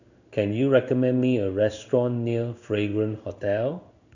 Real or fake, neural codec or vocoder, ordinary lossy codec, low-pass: fake; codec, 16 kHz in and 24 kHz out, 1 kbps, XY-Tokenizer; none; 7.2 kHz